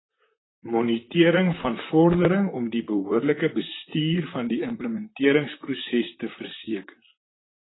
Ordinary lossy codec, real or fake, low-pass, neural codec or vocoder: AAC, 16 kbps; fake; 7.2 kHz; vocoder, 44.1 kHz, 128 mel bands, Pupu-Vocoder